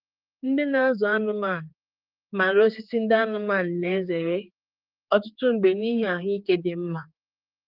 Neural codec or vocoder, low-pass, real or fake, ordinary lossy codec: codec, 16 kHz, 4 kbps, X-Codec, HuBERT features, trained on general audio; 5.4 kHz; fake; Opus, 32 kbps